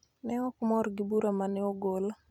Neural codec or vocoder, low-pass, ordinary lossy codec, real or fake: vocoder, 44.1 kHz, 128 mel bands every 256 samples, BigVGAN v2; 19.8 kHz; none; fake